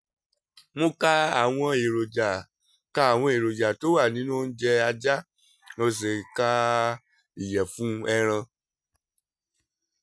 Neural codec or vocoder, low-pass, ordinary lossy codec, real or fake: none; none; none; real